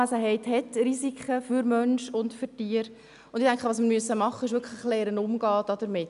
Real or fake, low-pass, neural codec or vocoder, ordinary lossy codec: real; 10.8 kHz; none; none